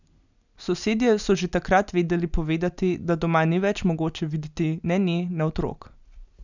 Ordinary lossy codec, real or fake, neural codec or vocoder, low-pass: none; real; none; 7.2 kHz